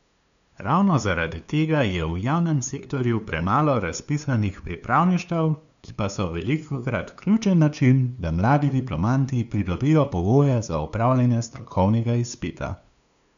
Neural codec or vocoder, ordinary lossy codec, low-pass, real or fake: codec, 16 kHz, 2 kbps, FunCodec, trained on LibriTTS, 25 frames a second; none; 7.2 kHz; fake